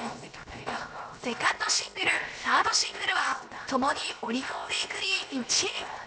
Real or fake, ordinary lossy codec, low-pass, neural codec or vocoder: fake; none; none; codec, 16 kHz, 0.7 kbps, FocalCodec